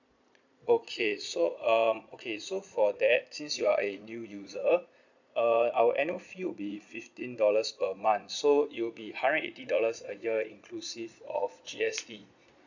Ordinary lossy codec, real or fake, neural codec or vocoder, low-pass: none; fake; vocoder, 22.05 kHz, 80 mel bands, Vocos; 7.2 kHz